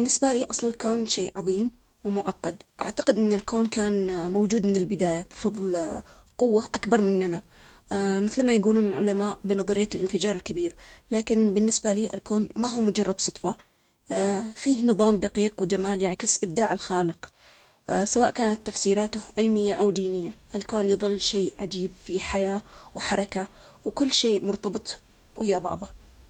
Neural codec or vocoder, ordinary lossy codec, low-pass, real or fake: codec, 44.1 kHz, 2.6 kbps, DAC; none; 19.8 kHz; fake